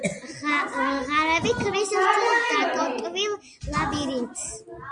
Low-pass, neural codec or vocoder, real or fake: 9.9 kHz; none; real